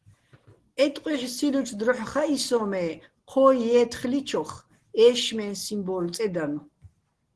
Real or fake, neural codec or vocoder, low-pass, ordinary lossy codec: real; none; 10.8 kHz; Opus, 16 kbps